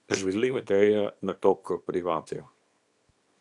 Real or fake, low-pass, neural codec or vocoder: fake; 10.8 kHz; codec, 24 kHz, 0.9 kbps, WavTokenizer, small release